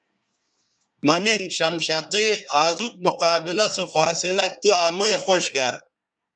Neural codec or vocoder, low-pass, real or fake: codec, 24 kHz, 1 kbps, SNAC; 9.9 kHz; fake